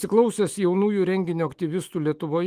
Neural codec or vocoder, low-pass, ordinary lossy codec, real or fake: autoencoder, 48 kHz, 128 numbers a frame, DAC-VAE, trained on Japanese speech; 14.4 kHz; Opus, 24 kbps; fake